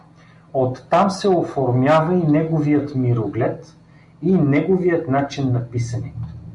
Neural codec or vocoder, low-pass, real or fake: none; 10.8 kHz; real